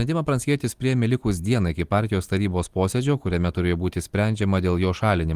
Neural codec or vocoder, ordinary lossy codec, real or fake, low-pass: none; Opus, 24 kbps; real; 14.4 kHz